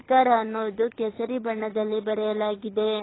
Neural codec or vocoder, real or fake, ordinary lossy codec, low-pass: codec, 24 kHz, 6 kbps, HILCodec; fake; AAC, 16 kbps; 7.2 kHz